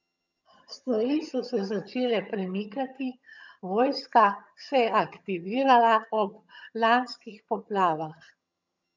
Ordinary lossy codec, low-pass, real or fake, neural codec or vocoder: none; 7.2 kHz; fake; vocoder, 22.05 kHz, 80 mel bands, HiFi-GAN